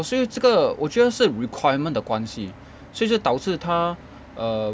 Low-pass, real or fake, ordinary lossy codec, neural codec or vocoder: none; real; none; none